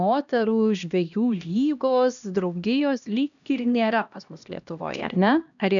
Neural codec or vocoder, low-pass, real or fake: codec, 16 kHz, 1 kbps, X-Codec, HuBERT features, trained on LibriSpeech; 7.2 kHz; fake